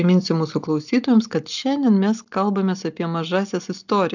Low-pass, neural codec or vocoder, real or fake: 7.2 kHz; none; real